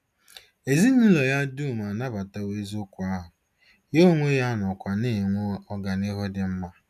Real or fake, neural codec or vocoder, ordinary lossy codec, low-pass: real; none; none; 14.4 kHz